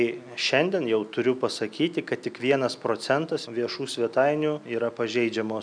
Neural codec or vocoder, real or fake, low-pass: none; real; 9.9 kHz